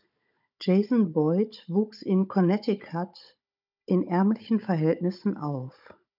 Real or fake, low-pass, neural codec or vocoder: fake; 5.4 kHz; codec, 16 kHz, 16 kbps, FunCodec, trained on Chinese and English, 50 frames a second